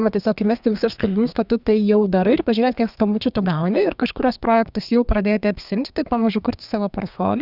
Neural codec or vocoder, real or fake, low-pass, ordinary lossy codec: codec, 32 kHz, 1.9 kbps, SNAC; fake; 5.4 kHz; Opus, 64 kbps